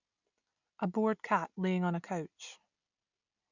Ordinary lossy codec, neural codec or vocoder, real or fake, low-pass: none; none; real; 7.2 kHz